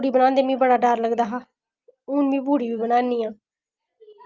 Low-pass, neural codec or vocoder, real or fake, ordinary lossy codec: 7.2 kHz; none; real; Opus, 24 kbps